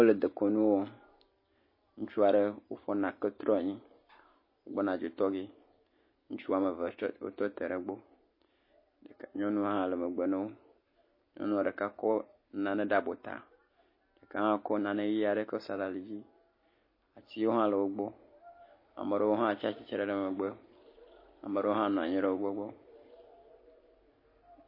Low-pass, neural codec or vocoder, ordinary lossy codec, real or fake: 5.4 kHz; none; MP3, 24 kbps; real